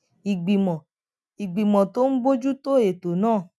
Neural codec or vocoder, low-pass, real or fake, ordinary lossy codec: none; none; real; none